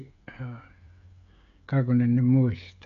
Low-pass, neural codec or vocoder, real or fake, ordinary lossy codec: 7.2 kHz; codec, 16 kHz, 16 kbps, FreqCodec, smaller model; fake; none